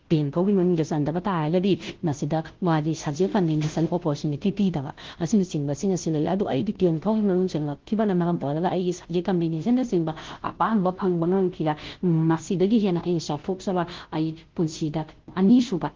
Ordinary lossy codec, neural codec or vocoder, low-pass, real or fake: Opus, 16 kbps; codec, 16 kHz, 0.5 kbps, FunCodec, trained on Chinese and English, 25 frames a second; 7.2 kHz; fake